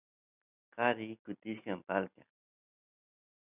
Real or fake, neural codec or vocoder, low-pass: real; none; 3.6 kHz